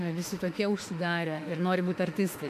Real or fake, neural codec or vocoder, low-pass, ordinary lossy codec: fake; autoencoder, 48 kHz, 32 numbers a frame, DAC-VAE, trained on Japanese speech; 14.4 kHz; MP3, 64 kbps